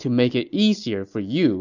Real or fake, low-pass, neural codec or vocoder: real; 7.2 kHz; none